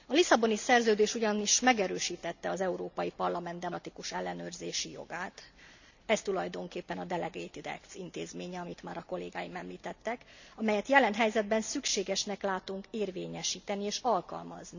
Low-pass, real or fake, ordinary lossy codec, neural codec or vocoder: 7.2 kHz; real; none; none